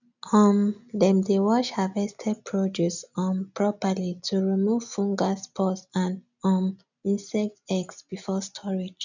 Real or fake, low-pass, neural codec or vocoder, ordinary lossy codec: real; 7.2 kHz; none; none